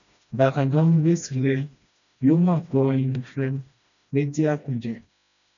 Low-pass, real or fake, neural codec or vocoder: 7.2 kHz; fake; codec, 16 kHz, 1 kbps, FreqCodec, smaller model